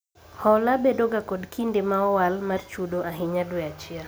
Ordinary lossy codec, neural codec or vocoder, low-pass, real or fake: none; none; none; real